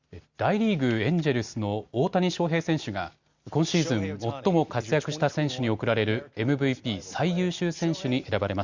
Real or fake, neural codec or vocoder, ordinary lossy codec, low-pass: real; none; Opus, 64 kbps; 7.2 kHz